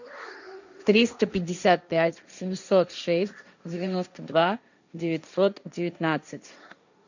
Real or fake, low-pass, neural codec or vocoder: fake; 7.2 kHz; codec, 16 kHz, 1.1 kbps, Voila-Tokenizer